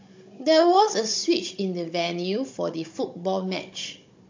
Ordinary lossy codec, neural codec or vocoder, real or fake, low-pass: MP3, 48 kbps; codec, 16 kHz, 16 kbps, FunCodec, trained on Chinese and English, 50 frames a second; fake; 7.2 kHz